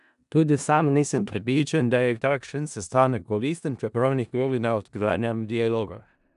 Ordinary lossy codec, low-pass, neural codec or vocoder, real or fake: none; 10.8 kHz; codec, 16 kHz in and 24 kHz out, 0.4 kbps, LongCat-Audio-Codec, four codebook decoder; fake